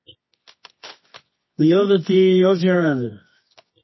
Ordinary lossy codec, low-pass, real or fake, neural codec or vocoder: MP3, 24 kbps; 7.2 kHz; fake; codec, 24 kHz, 0.9 kbps, WavTokenizer, medium music audio release